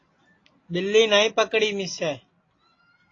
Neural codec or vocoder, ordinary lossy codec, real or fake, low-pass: none; AAC, 32 kbps; real; 7.2 kHz